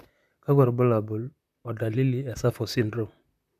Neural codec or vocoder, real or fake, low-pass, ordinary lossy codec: vocoder, 44.1 kHz, 128 mel bands, Pupu-Vocoder; fake; 14.4 kHz; none